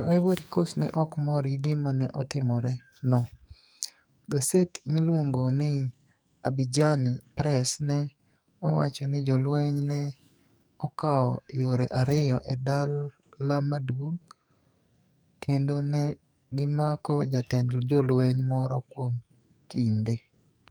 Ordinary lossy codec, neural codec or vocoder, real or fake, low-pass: none; codec, 44.1 kHz, 2.6 kbps, SNAC; fake; none